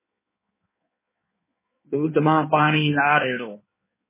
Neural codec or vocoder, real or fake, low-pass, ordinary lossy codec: codec, 16 kHz in and 24 kHz out, 1.1 kbps, FireRedTTS-2 codec; fake; 3.6 kHz; MP3, 16 kbps